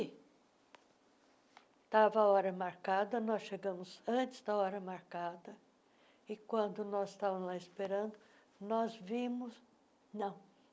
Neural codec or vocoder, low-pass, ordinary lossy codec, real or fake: none; none; none; real